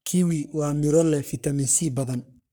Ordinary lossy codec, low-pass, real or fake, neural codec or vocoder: none; none; fake; codec, 44.1 kHz, 3.4 kbps, Pupu-Codec